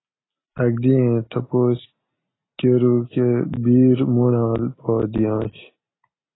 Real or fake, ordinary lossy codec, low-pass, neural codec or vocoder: real; AAC, 16 kbps; 7.2 kHz; none